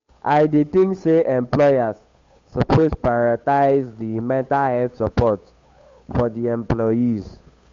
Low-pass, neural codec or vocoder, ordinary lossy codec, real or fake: 7.2 kHz; codec, 16 kHz, 8 kbps, FunCodec, trained on Chinese and English, 25 frames a second; MP3, 48 kbps; fake